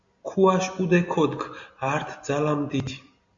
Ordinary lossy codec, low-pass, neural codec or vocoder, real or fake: MP3, 48 kbps; 7.2 kHz; none; real